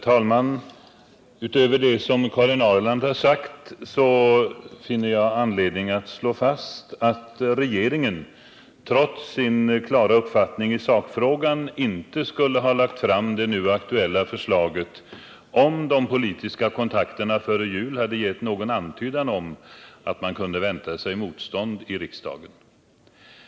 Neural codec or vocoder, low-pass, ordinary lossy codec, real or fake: none; none; none; real